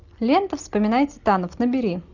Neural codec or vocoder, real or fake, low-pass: none; real; 7.2 kHz